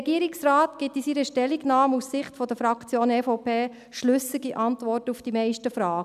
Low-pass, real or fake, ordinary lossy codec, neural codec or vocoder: 14.4 kHz; real; none; none